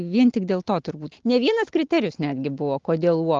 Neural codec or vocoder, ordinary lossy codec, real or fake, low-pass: none; Opus, 16 kbps; real; 7.2 kHz